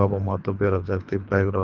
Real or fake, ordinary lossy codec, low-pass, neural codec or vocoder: fake; Opus, 32 kbps; 7.2 kHz; codec, 24 kHz, 3 kbps, HILCodec